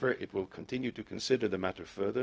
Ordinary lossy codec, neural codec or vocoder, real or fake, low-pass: none; codec, 16 kHz, 0.4 kbps, LongCat-Audio-Codec; fake; none